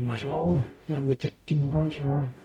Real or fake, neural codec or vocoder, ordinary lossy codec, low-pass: fake; codec, 44.1 kHz, 0.9 kbps, DAC; none; 19.8 kHz